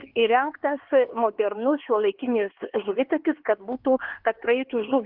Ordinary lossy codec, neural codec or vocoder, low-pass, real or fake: Opus, 16 kbps; codec, 16 kHz, 4 kbps, X-Codec, HuBERT features, trained on LibriSpeech; 5.4 kHz; fake